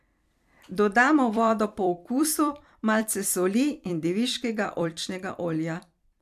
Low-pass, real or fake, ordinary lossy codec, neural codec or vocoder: 14.4 kHz; fake; MP3, 96 kbps; vocoder, 44.1 kHz, 128 mel bands every 256 samples, BigVGAN v2